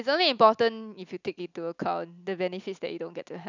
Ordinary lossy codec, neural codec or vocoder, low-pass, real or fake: none; none; 7.2 kHz; real